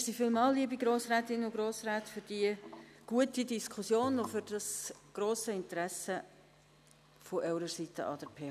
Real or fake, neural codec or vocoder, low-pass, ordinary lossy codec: real; none; 14.4 kHz; none